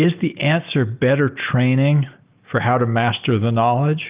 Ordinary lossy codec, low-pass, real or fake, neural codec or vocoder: Opus, 32 kbps; 3.6 kHz; real; none